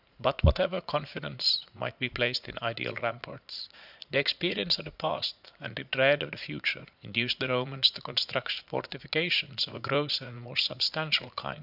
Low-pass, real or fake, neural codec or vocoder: 5.4 kHz; fake; vocoder, 44.1 kHz, 80 mel bands, Vocos